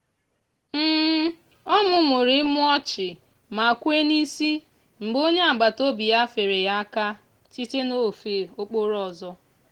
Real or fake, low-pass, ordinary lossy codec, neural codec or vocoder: real; 19.8 kHz; Opus, 16 kbps; none